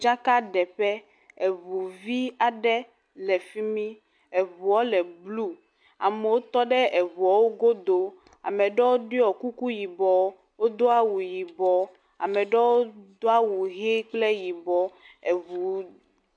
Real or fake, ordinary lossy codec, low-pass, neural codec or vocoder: real; MP3, 64 kbps; 9.9 kHz; none